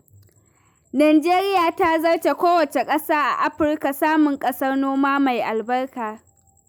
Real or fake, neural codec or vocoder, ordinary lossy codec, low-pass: real; none; none; none